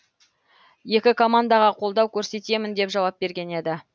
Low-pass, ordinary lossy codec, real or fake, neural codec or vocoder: none; none; real; none